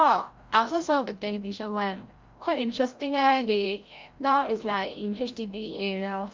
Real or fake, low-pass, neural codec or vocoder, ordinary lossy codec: fake; 7.2 kHz; codec, 16 kHz, 0.5 kbps, FreqCodec, larger model; Opus, 32 kbps